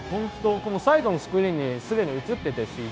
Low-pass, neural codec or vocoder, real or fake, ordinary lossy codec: none; codec, 16 kHz, 0.9 kbps, LongCat-Audio-Codec; fake; none